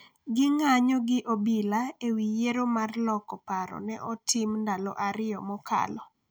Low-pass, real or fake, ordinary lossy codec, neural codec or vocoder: none; real; none; none